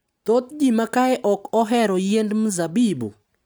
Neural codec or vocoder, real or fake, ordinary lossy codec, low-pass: none; real; none; none